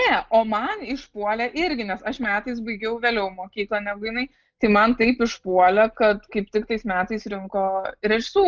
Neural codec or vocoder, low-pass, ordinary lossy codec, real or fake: none; 7.2 kHz; Opus, 24 kbps; real